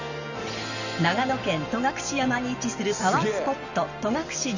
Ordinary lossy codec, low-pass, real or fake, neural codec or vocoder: none; 7.2 kHz; real; none